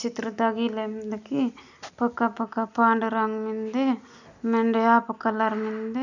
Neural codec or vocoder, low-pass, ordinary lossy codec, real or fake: none; 7.2 kHz; none; real